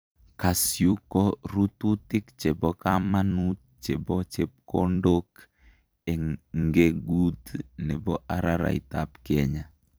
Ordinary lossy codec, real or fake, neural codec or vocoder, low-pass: none; fake; vocoder, 44.1 kHz, 128 mel bands every 256 samples, BigVGAN v2; none